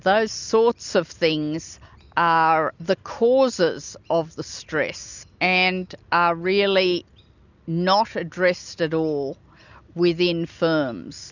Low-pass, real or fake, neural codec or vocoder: 7.2 kHz; real; none